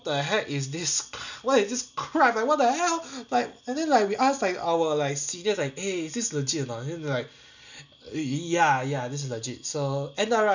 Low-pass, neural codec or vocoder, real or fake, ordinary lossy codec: 7.2 kHz; none; real; none